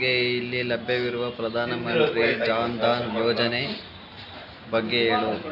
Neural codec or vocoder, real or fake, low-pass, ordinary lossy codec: none; real; 5.4 kHz; none